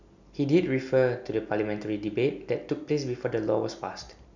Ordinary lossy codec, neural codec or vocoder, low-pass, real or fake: none; none; 7.2 kHz; real